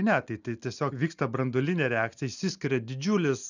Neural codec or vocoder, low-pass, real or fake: none; 7.2 kHz; real